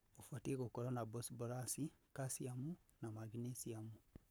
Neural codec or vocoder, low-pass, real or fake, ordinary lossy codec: codec, 44.1 kHz, 7.8 kbps, Pupu-Codec; none; fake; none